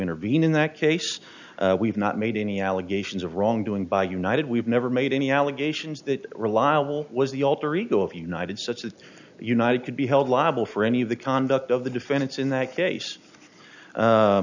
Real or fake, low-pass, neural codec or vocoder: real; 7.2 kHz; none